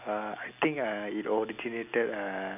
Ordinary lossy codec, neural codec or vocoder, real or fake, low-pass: none; none; real; 3.6 kHz